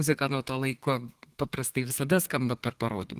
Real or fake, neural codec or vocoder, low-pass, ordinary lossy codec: fake; codec, 32 kHz, 1.9 kbps, SNAC; 14.4 kHz; Opus, 24 kbps